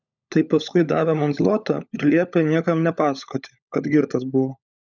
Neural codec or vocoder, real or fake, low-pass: codec, 16 kHz, 16 kbps, FunCodec, trained on LibriTTS, 50 frames a second; fake; 7.2 kHz